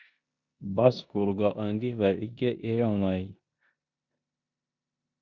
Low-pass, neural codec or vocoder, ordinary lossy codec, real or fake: 7.2 kHz; codec, 16 kHz in and 24 kHz out, 0.9 kbps, LongCat-Audio-Codec, four codebook decoder; Opus, 64 kbps; fake